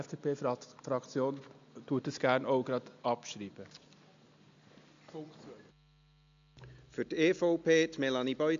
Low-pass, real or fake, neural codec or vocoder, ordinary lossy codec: 7.2 kHz; real; none; MP3, 64 kbps